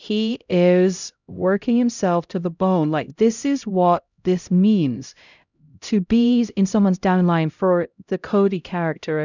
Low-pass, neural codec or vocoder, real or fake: 7.2 kHz; codec, 16 kHz, 0.5 kbps, X-Codec, HuBERT features, trained on LibriSpeech; fake